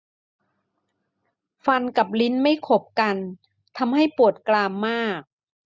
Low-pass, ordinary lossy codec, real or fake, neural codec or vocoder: none; none; real; none